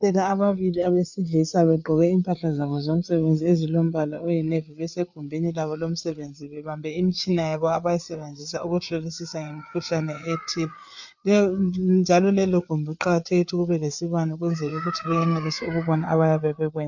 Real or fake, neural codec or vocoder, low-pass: fake; codec, 16 kHz, 4 kbps, FreqCodec, larger model; 7.2 kHz